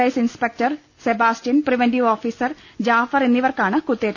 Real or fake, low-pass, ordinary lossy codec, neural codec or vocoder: real; 7.2 kHz; none; none